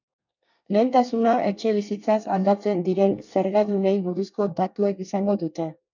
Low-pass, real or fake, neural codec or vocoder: 7.2 kHz; fake; codec, 24 kHz, 1 kbps, SNAC